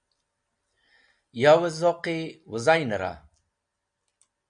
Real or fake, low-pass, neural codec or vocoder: real; 9.9 kHz; none